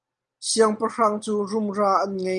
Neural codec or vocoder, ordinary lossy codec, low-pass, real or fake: none; Opus, 32 kbps; 9.9 kHz; real